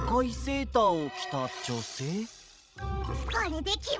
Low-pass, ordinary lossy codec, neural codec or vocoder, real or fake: none; none; codec, 16 kHz, 16 kbps, FreqCodec, larger model; fake